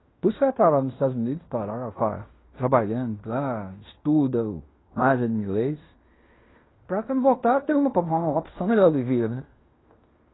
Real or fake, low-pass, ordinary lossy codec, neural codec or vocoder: fake; 7.2 kHz; AAC, 16 kbps; codec, 16 kHz in and 24 kHz out, 0.9 kbps, LongCat-Audio-Codec, fine tuned four codebook decoder